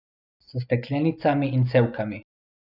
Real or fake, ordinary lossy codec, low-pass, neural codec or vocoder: real; none; 5.4 kHz; none